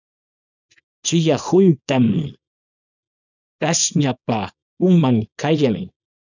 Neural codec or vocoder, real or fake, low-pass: codec, 24 kHz, 0.9 kbps, WavTokenizer, small release; fake; 7.2 kHz